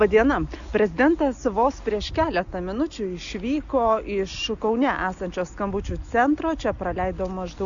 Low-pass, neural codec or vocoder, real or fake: 7.2 kHz; none; real